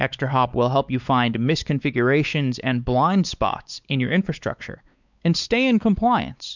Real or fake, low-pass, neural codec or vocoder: fake; 7.2 kHz; codec, 16 kHz, 4 kbps, X-Codec, WavLM features, trained on Multilingual LibriSpeech